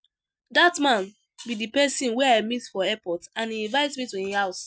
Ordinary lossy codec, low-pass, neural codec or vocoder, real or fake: none; none; none; real